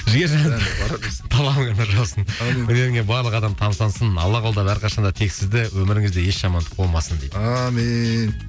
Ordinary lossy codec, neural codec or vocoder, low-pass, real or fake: none; none; none; real